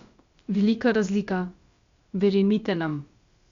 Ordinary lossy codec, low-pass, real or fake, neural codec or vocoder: Opus, 64 kbps; 7.2 kHz; fake; codec, 16 kHz, about 1 kbps, DyCAST, with the encoder's durations